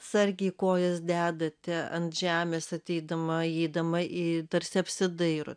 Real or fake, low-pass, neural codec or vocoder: real; 9.9 kHz; none